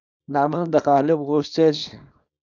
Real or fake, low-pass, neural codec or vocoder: fake; 7.2 kHz; codec, 24 kHz, 0.9 kbps, WavTokenizer, small release